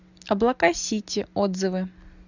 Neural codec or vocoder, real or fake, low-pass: none; real; 7.2 kHz